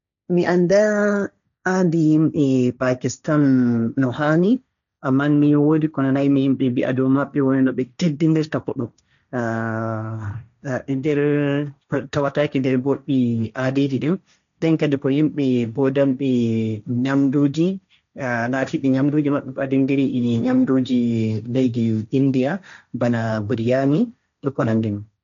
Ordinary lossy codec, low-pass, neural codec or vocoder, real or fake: none; 7.2 kHz; codec, 16 kHz, 1.1 kbps, Voila-Tokenizer; fake